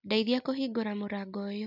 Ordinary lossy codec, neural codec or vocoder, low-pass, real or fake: none; none; 5.4 kHz; real